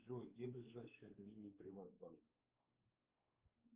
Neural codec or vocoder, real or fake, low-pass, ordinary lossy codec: codec, 16 kHz, 4 kbps, X-Codec, WavLM features, trained on Multilingual LibriSpeech; fake; 3.6 kHz; Opus, 32 kbps